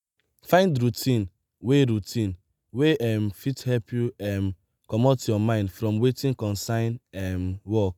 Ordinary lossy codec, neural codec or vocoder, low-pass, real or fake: none; none; 19.8 kHz; real